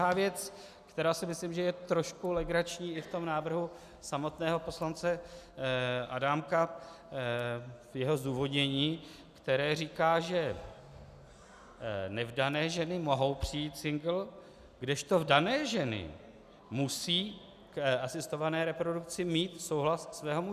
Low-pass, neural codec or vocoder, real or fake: 14.4 kHz; none; real